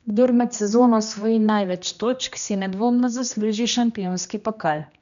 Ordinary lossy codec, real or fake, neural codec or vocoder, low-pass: none; fake; codec, 16 kHz, 1 kbps, X-Codec, HuBERT features, trained on general audio; 7.2 kHz